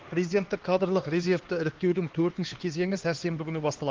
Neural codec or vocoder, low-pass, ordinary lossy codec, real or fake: codec, 16 kHz, 2 kbps, X-Codec, HuBERT features, trained on LibriSpeech; 7.2 kHz; Opus, 16 kbps; fake